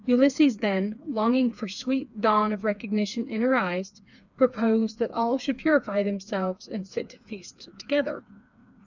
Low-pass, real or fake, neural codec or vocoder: 7.2 kHz; fake; codec, 16 kHz, 4 kbps, FreqCodec, smaller model